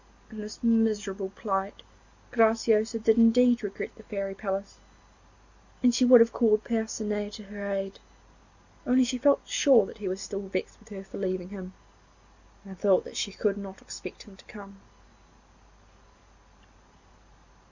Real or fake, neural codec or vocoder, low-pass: real; none; 7.2 kHz